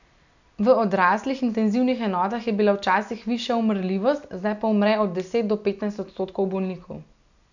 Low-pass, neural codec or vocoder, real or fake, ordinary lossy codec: 7.2 kHz; none; real; none